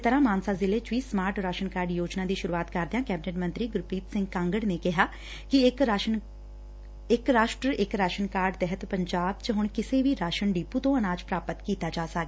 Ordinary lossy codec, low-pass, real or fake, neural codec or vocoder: none; none; real; none